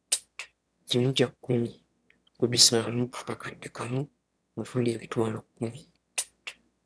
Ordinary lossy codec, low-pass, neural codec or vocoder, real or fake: none; none; autoencoder, 22.05 kHz, a latent of 192 numbers a frame, VITS, trained on one speaker; fake